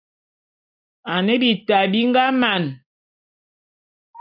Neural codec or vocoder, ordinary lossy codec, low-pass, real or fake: none; AAC, 48 kbps; 5.4 kHz; real